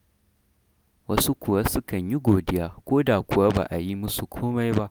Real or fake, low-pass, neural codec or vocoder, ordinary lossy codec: real; none; none; none